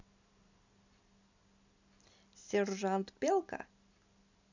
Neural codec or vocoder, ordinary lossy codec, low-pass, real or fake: none; none; 7.2 kHz; real